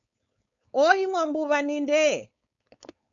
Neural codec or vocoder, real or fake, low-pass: codec, 16 kHz, 4.8 kbps, FACodec; fake; 7.2 kHz